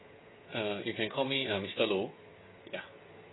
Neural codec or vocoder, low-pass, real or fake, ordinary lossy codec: codec, 16 kHz, 16 kbps, FreqCodec, smaller model; 7.2 kHz; fake; AAC, 16 kbps